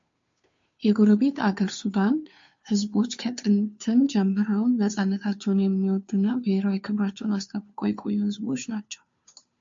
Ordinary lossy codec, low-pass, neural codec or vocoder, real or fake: MP3, 48 kbps; 7.2 kHz; codec, 16 kHz, 2 kbps, FunCodec, trained on Chinese and English, 25 frames a second; fake